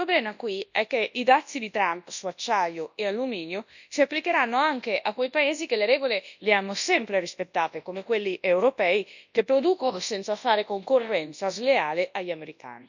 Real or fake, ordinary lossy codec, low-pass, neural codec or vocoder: fake; none; 7.2 kHz; codec, 24 kHz, 0.9 kbps, WavTokenizer, large speech release